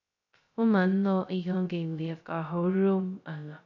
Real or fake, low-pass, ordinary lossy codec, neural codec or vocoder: fake; 7.2 kHz; none; codec, 16 kHz, 0.2 kbps, FocalCodec